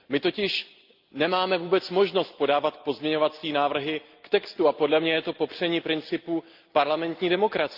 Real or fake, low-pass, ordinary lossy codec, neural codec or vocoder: real; 5.4 kHz; Opus, 32 kbps; none